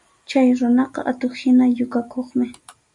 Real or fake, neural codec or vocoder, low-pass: real; none; 10.8 kHz